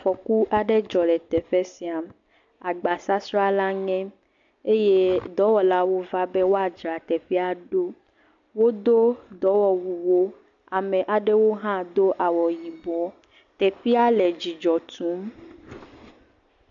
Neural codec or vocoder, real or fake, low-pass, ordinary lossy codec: none; real; 7.2 kHz; AAC, 64 kbps